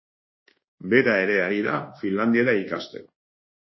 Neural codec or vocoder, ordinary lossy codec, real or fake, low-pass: codec, 24 kHz, 1.2 kbps, DualCodec; MP3, 24 kbps; fake; 7.2 kHz